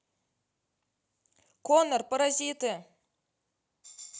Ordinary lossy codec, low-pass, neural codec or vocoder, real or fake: none; none; none; real